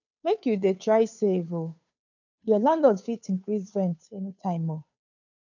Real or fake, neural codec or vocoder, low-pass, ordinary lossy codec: fake; codec, 16 kHz, 2 kbps, FunCodec, trained on Chinese and English, 25 frames a second; 7.2 kHz; none